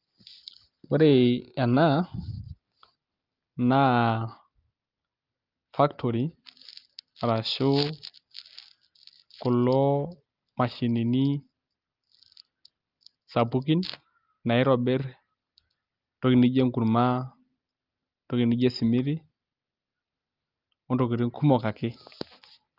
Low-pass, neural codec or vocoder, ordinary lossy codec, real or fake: 5.4 kHz; none; Opus, 32 kbps; real